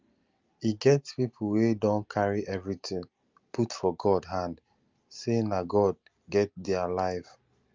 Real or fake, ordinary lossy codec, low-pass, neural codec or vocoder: real; Opus, 24 kbps; 7.2 kHz; none